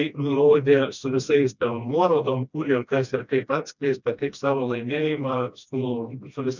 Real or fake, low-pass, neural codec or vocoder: fake; 7.2 kHz; codec, 16 kHz, 1 kbps, FreqCodec, smaller model